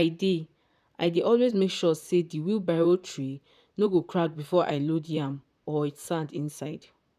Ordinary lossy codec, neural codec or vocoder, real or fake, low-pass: none; vocoder, 44.1 kHz, 128 mel bands, Pupu-Vocoder; fake; 14.4 kHz